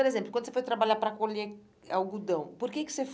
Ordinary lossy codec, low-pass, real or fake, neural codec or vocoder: none; none; real; none